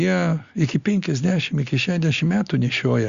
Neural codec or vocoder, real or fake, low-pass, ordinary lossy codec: none; real; 7.2 kHz; Opus, 64 kbps